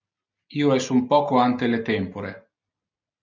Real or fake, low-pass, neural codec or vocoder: real; 7.2 kHz; none